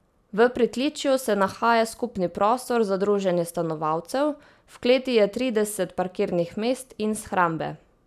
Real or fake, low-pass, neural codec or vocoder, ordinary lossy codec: real; 14.4 kHz; none; none